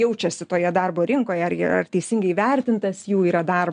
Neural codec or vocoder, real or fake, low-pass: none; real; 9.9 kHz